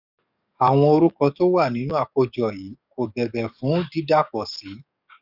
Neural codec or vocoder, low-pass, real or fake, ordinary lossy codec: codec, 44.1 kHz, 7.8 kbps, DAC; 5.4 kHz; fake; none